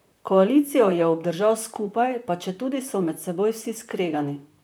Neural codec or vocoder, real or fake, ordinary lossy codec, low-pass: vocoder, 44.1 kHz, 128 mel bands, Pupu-Vocoder; fake; none; none